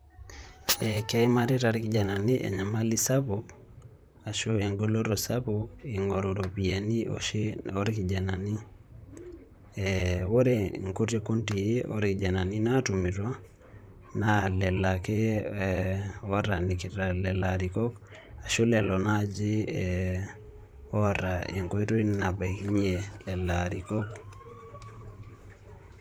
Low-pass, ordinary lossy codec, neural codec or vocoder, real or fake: none; none; vocoder, 44.1 kHz, 128 mel bands, Pupu-Vocoder; fake